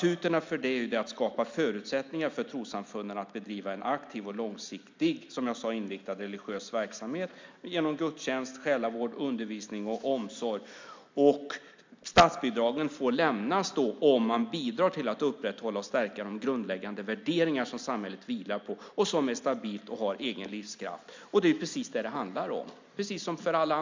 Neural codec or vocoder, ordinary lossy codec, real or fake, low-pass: none; MP3, 64 kbps; real; 7.2 kHz